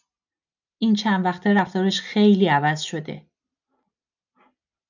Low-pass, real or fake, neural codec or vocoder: 7.2 kHz; real; none